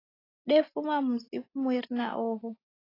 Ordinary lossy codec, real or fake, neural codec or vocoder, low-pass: AAC, 24 kbps; real; none; 5.4 kHz